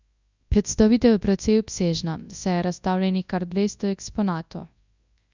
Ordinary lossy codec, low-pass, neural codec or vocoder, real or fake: Opus, 64 kbps; 7.2 kHz; codec, 24 kHz, 0.9 kbps, WavTokenizer, large speech release; fake